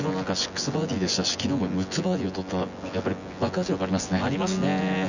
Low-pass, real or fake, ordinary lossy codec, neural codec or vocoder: 7.2 kHz; fake; none; vocoder, 24 kHz, 100 mel bands, Vocos